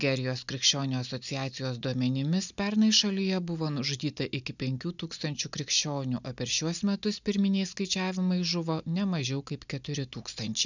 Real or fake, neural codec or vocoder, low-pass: real; none; 7.2 kHz